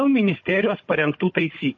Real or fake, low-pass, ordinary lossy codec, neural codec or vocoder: fake; 7.2 kHz; MP3, 32 kbps; codec, 16 kHz, 16 kbps, FunCodec, trained on Chinese and English, 50 frames a second